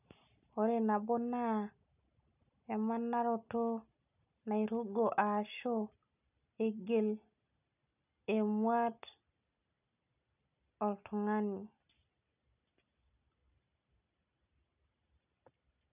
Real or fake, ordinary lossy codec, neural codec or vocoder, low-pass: real; none; none; 3.6 kHz